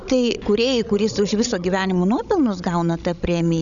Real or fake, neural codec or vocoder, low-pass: fake; codec, 16 kHz, 16 kbps, FreqCodec, larger model; 7.2 kHz